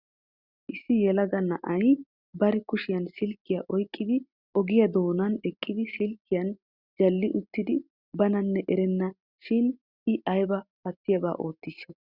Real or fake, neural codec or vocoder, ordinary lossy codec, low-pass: real; none; Opus, 64 kbps; 5.4 kHz